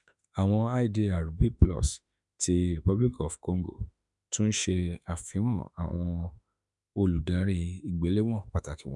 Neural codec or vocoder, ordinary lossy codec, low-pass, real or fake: autoencoder, 48 kHz, 32 numbers a frame, DAC-VAE, trained on Japanese speech; none; 10.8 kHz; fake